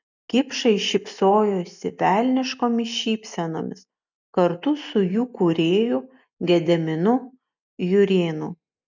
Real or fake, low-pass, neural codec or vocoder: real; 7.2 kHz; none